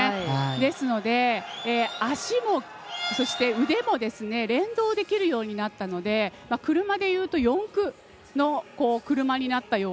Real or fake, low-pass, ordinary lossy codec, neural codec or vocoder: real; none; none; none